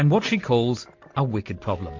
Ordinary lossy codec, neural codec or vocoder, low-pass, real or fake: MP3, 48 kbps; none; 7.2 kHz; real